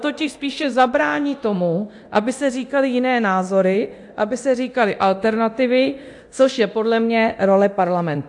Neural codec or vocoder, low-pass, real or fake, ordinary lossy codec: codec, 24 kHz, 0.9 kbps, DualCodec; 10.8 kHz; fake; AAC, 64 kbps